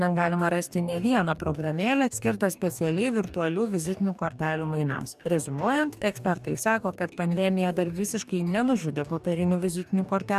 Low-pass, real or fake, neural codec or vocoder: 14.4 kHz; fake; codec, 44.1 kHz, 2.6 kbps, DAC